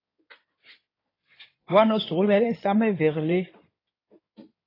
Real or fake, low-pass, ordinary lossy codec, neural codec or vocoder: fake; 5.4 kHz; AAC, 24 kbps; codec, 16 kHz in and 24 kHz out, 2.2 kbps, FireRedTTS-2 codec